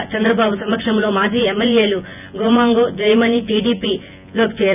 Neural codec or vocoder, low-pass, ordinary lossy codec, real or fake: vocoder, 24 kHz, 100 mel bands, Vocos; 3.6 kHz; none; fake